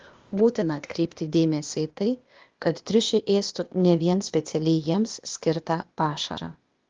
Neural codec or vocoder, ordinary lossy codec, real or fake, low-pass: codec, 16 kHz, 0.8 kbps, ZipCodec; Opus, 32 kbps; fake; 7.2 kHz